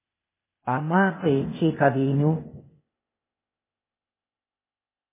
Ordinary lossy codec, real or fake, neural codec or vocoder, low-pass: MP3, 16 kbps; fake; codec, 16 kHz, 0.8 kbps, ZipCodec; 3.6 kHz